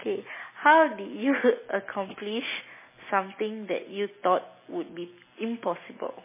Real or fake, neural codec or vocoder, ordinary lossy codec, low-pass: real; none; MP3, 16 kbps; 3.6 kHz